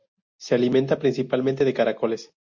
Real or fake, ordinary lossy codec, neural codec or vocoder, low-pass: real; MP3, 64 kbps; none; 7.2 kHz